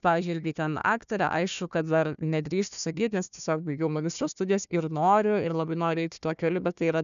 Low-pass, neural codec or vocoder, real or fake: 7.2 kHz; codec, 16 kHz, 1 kbps, FunCodec, trained on Chinese and English, 50 frames a second; fake